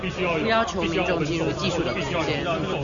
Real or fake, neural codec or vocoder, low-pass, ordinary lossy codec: real; none; 7.2 kHz; Opus, 64 kbps